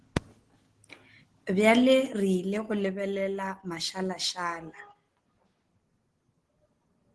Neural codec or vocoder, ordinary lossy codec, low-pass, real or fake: none; Opus, 16 kbps; 10.8 kHz; real